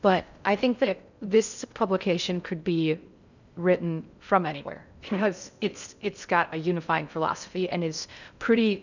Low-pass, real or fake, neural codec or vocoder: 7.2 kHz; fake; codec, 16 kHz in and 24 kHz out, 0.6 kbps, FocalCodec, streaming, 4096 codes